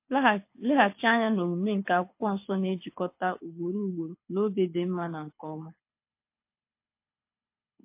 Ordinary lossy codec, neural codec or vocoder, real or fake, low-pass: MP3, 24 kbps; codec, 24 kHz, 6 kbps, HILCodec; fake; 3.6 kHz